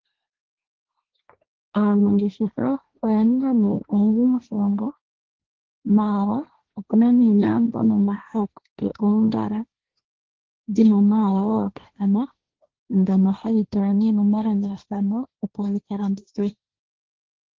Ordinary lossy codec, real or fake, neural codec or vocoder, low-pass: Opus, 16 kbps; fake; codec, 16 kHz, 1.1 kbps, Voila-Tokenizer; 7.2 kHz